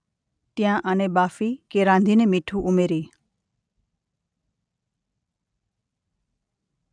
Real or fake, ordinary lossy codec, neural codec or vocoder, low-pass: real; none; none; 9.9 kHz